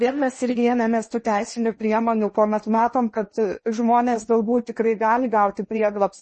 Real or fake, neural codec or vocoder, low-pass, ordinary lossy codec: fake; codec, 16 kHz in and 24 kHz out, 0.8 kbps, FocalCodec, streaming, 65536 codes; 10.8 kHz; MP3, 32 kbps